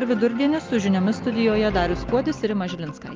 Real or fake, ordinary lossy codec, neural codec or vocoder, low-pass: real; Opus, 32 kbps; none; 7.2 kHz